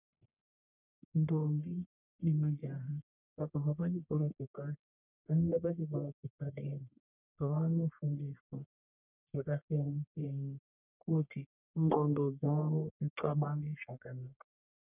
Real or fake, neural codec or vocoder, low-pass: fake; codec, 44.1 kHz, 1.7 kbps, Pupu-Codec; 3.6 kHz